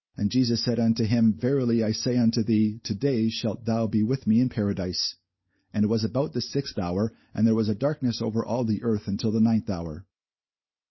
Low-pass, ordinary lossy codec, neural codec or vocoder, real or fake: 7.2 kHz; MP3, 24 kbps; none; real